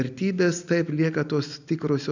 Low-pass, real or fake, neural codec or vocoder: 7.2 kHz; real; none